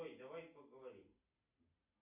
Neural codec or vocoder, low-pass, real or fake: none; 3.6 kHz; real